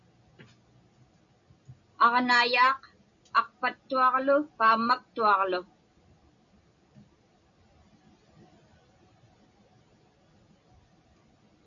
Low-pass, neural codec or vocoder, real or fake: 7.2 kHz; none; real